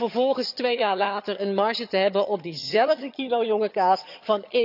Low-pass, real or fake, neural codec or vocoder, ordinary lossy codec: 5.4 kHz; fake; vocoder, 22.05 kHz, 80 mel bands, HiFi-GAN; none